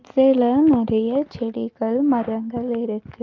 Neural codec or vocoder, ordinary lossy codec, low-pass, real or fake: none; Opus, 24 kbps; 7.2 kHz; real